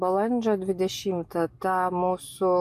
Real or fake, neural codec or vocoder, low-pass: real; none; 14.4 kHz